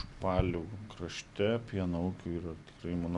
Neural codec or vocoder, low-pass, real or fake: none; 10.8 kHz; real